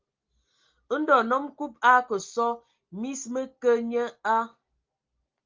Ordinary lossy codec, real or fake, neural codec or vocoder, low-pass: Opus, 32 kbps; real; none; 7.2 kHz